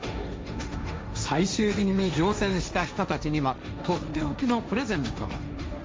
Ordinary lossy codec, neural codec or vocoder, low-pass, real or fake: none; codec, 16 kHz, 1.1 kbps, Voila-Tokenizer; none; fake